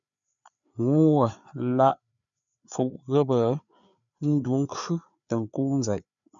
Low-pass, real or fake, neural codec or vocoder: 7.2 kHz; fake; codec, 16 kHz, 4 kbps, FreqCodec, larger model